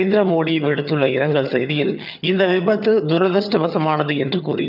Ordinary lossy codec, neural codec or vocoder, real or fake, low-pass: none; vocoder, 22.05 kHz, 80 mel bands, HiFi-GAN; fake; 5.4 kHz